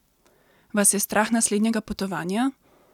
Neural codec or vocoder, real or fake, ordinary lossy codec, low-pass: none; real; none; 19.8 kHz